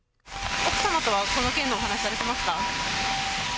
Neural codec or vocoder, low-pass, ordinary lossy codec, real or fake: none; none; none; real